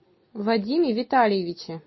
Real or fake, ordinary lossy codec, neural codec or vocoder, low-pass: real; MP3, 24 kbps; none; 7.2 kHz